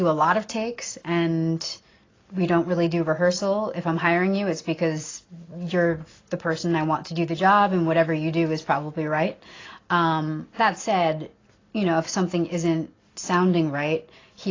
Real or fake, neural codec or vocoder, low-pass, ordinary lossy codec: real; none; 7.2 kHz; AAC, 32 kbps